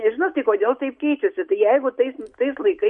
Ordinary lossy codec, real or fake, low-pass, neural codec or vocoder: MP3, 48 kbps; real; 10.8 kHz; none